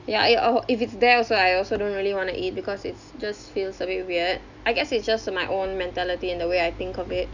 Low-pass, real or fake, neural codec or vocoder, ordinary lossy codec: 7.2 kHz; real; none; none